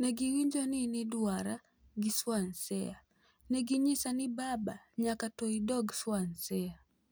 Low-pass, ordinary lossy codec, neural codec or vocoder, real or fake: none; none; none; real